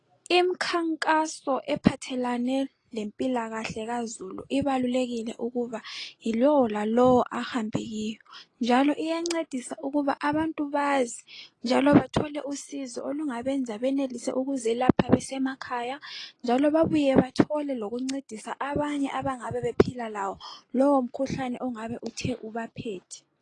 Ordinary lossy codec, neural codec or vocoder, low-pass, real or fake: AAC, 32 kbps; none; 10.8 kHz; real